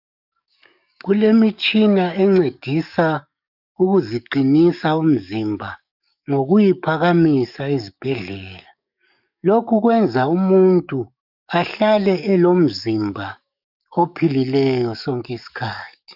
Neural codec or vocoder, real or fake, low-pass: codec, 44.1 kHz, 7.8 kbps, DAC; fake; 5.4 kHz